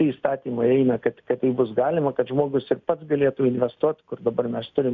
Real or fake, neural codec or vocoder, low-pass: real; none; 7.2 kHz